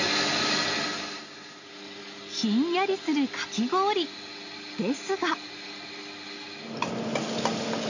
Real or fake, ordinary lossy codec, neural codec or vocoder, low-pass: real; none; none; 7.2 kHz